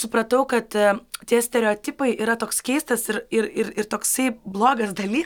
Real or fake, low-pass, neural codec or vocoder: real; 19.8 kHz; none